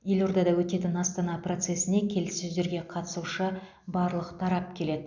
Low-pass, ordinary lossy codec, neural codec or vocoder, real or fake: 7.2 kHz; none; none; real